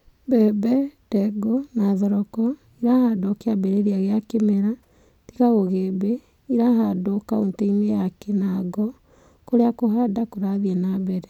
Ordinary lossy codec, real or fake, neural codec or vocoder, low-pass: none; real; none; 19.8 kHz